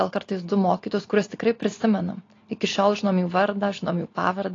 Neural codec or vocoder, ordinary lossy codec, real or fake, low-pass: none; AAC, 32 kbps; real; 7.2 kHz